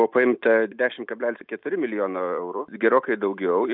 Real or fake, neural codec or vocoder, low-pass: real; none; 5.4 kHz